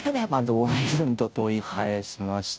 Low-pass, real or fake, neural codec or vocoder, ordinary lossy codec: none; fake; codec, 16 kHz, 0.5 kbps, FunCodec, trained on Chinese and English, 25 frames a second; none